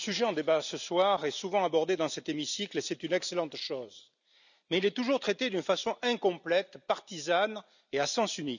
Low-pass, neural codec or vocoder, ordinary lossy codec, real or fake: 7.2 kHz; none; none; real